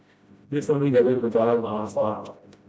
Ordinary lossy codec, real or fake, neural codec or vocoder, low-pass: none; fake; codec, 16 kHz, 0.5 kbps, FreqCodec, smaller model; none